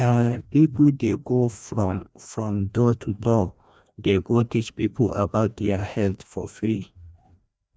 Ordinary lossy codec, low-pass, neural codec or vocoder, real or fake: none; none; codec, 16 kHz, 1 kbps, FreqCodec, larger model; fake